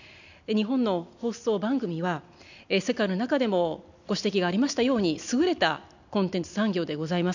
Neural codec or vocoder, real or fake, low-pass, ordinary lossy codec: none; real; 7.2 kHz; none